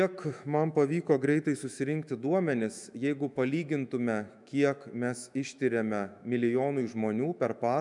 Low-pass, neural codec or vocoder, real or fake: 10.8 kHz; autoencoder, 48 kHz, 128 numbers a frame, DAC-VAE, trained on Japanese speech; fake